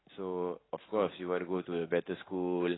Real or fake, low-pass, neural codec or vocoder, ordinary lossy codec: real; 7.2 kHz; none; AAC, 16 kbps